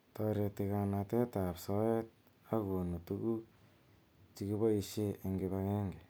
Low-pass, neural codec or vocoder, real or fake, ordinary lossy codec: none; none; real; none